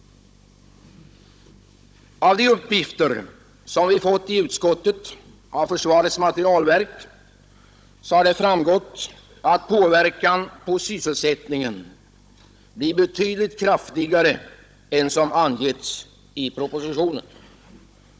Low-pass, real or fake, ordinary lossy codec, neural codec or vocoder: none; fake; none; codec, 16 kHz, 16 kbps, FunCodec, trained on Chinese and English, 50 frames a second